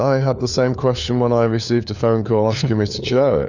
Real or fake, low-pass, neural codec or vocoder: fake; 7.2 kHz; codec, 16 kHz, 4 kbps, FunCodec, trained on LibriTTS, 50 frames a second